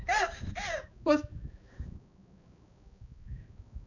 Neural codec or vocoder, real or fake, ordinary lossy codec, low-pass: codec, 16 kHz, 2 kbps, X-Codec, HuBERT features, trained on balanced general audio; fake; none; 7.2 kHz